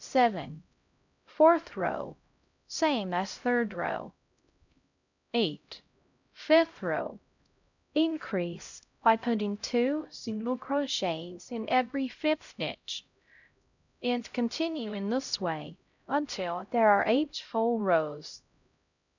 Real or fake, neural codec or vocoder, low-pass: fake; codec, 16 kHz, 0.5 kbps, X-Codec, HuBERT features, trained on LibriSpeech; 7.2 kHz